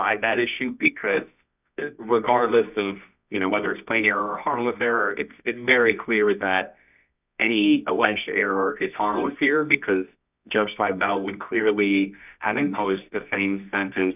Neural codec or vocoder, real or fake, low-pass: codec, 24 kHz, 0.9 kbps, WavTokenizer, medium music audio release; fake; 3.6 kHz